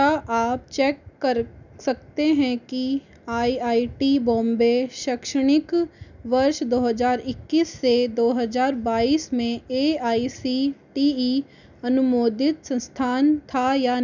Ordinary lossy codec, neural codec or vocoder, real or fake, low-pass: none; none; real; 7.2 kHz